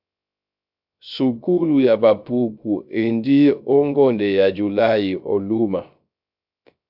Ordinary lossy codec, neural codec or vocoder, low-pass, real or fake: AAC, 48 kbps; codec, 16 kHz, 0.3 kbps, FocalCodec; 5.4 kHz; fake